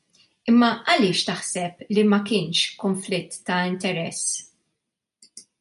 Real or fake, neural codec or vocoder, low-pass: real; none; 10.8 kHz